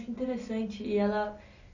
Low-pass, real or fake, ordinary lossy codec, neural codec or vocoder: 7.2 kHz; real; none; none